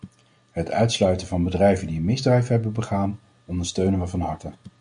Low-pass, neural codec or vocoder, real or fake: 9.9 kHz; none; real